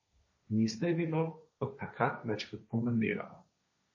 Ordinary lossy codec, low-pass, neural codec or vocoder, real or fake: MP3, 32 kbps; 7.2 kHz; codec, 16 kHz, 1.1 kbps, Voila-Tokenizer; fake